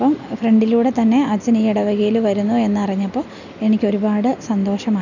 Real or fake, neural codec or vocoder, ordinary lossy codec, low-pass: real; none; none; 7.2 kHz